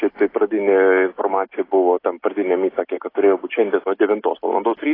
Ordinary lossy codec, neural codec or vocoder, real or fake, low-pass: AAC, 24 kbps; none; real; 5.4 kHz